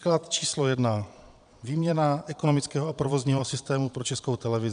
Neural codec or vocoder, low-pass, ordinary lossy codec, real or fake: vocoder, 22.05 kHz, 80 mel bands, Vocos; 9.9 kHz; AAC, 96 kbps; fake